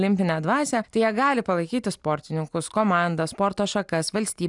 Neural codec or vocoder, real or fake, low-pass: none; real; 10.8 kHz